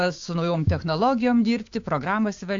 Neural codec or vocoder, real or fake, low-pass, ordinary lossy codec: codec, 16 kHz, 6 kbps, DAC; fake; 7.2 kHz; AAC, 48 kbps